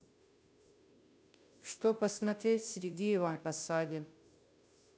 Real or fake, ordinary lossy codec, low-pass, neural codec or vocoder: fake; none; none; codec, 16 kHz, 0.5 kbps, FunCodec, trained on Chinese and English, 25 frames a second